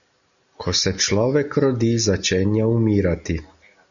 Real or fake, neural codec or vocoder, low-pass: real; none; 7.2 kHz